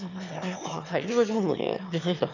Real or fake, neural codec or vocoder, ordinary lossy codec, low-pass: fake; autoencoder, 22.05 kHz, a latent of 192 numbers a frame, VITS, trained on one speaker; none; 7.2 kHz